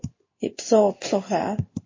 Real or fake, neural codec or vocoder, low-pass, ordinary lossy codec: fake; codec, 16 kHz in and 24 kHz out, 1 kbps, XY-Tokenizer; 7.2 kHz; MP3, 32 kbps